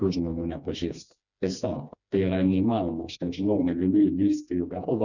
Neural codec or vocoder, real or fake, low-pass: codec, 16 kHz, 2 kbps, FreqCodec, smaller model; fake; 7.2 kHz